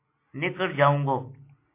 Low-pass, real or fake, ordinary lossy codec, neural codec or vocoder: 3.6 kHz; real; MP3, 24 kbps; none